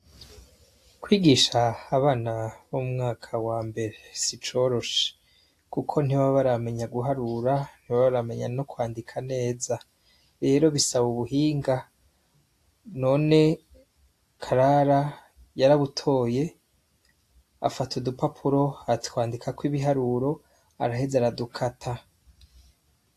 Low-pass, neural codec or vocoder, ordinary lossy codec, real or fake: 14.4 kHz; none; AAC, 64 kbps; real